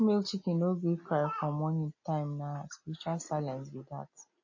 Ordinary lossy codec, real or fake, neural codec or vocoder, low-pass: MP3, 32 kbps; real; none; 7.2 kHz